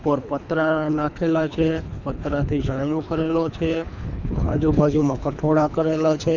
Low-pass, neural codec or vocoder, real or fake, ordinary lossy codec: 7.2 kHz; codec, 24 kHz, 3 kbps, HILCodec; fake; none